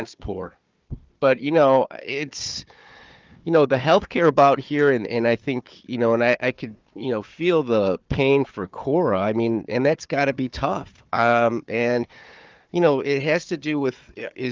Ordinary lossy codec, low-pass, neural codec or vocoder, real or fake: Opus, 24 kbps; 7.2 kHz; codec, 16 kHz, 4 kbps, FunCodec, trained on Chinese and English, 50 frames a second; fake